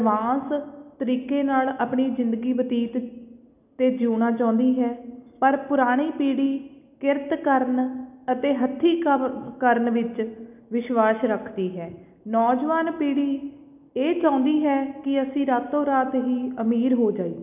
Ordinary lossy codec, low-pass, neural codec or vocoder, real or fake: none; 3.6 kHz; none; real